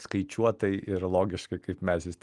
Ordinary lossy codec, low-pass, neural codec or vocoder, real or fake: Opus, 32 kbps; 10.8 kHz; none; real